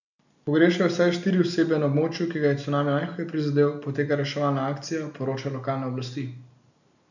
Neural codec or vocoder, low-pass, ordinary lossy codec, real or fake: none; 7.2 kHz; none; real